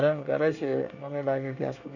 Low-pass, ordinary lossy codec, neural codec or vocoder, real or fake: 7.2 kHz; none; codec, 24 kHz, 1 kbps, SNAC; fake